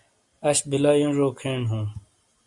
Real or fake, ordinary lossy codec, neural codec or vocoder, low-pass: real; Opus, 64 kbps; none; 10.8 kHz